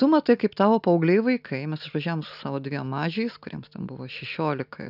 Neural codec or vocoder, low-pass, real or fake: none; 5.4 kHz; real